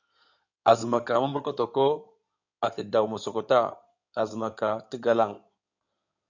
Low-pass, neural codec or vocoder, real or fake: 7.2 kHz; codec, 16 kHz in and 24 kHz out, 2.2 kbps, FireRedTTS-2 codec; fake